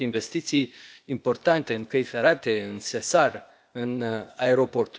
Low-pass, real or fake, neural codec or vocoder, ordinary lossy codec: none; fake; codec, 16 kHz, 0.8 kbps, ZipCodec; none